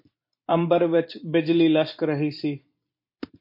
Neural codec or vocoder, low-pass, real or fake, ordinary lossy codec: vocoder, 44.1 kHz, 128 mel bands every 256 samples, BigVGAN v2; 5.4 kHz; fake; MP3, 32 kbps